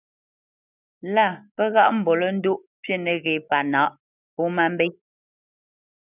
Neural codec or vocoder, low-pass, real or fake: none; 3.6 kHz; real